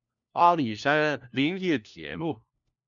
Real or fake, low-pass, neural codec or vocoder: fake; 7.2 kHz; codec, 16 kHz, 1 kbps, FunCodec, trained on LibriTTS, 50 frames a second